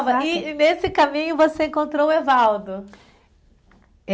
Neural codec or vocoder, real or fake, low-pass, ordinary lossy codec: none; real; none; none